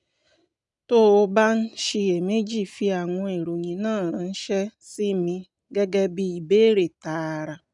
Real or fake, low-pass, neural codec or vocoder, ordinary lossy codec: real; 10.8 kHz; none; none